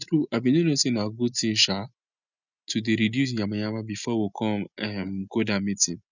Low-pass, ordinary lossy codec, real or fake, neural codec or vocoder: 7.2 kHz; none; real; none